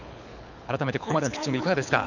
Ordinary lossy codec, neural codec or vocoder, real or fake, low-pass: none; codec, 24 kHz, 6 kbps, HILCodec; fake; 7.2 kHz